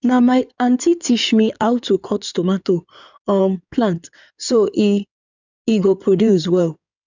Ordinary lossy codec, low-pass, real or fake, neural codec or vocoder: none; 7.2 kHz; fake; codec, 16 kHz in and 24 kHz out, 2.2 kbps, FireRedTTS-2 codec